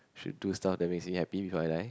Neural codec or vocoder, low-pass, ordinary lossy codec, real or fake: none; none; none; real